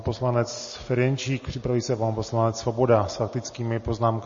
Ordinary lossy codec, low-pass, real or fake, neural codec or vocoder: MP3, 32 kbps; 7.2 kHz; real; none